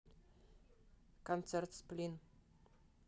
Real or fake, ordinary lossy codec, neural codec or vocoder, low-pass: real; none; none; none